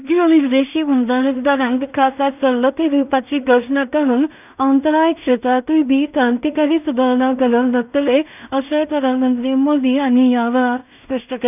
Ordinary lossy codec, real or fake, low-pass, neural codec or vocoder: none; fake; 3.6 kHz; codec, 16 kHz in and 24 kHz out, 0.4 kbps, LongCat-Audio-Codec, two codebook decoder